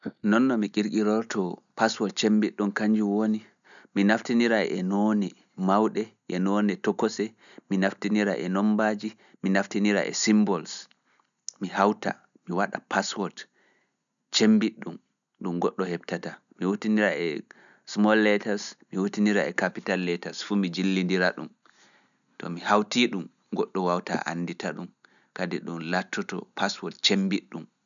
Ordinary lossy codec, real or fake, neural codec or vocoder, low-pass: none; real; none; 7.2 kHz